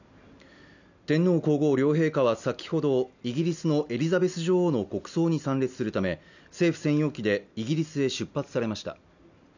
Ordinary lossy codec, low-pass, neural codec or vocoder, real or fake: none; 7.2 kHz; none; real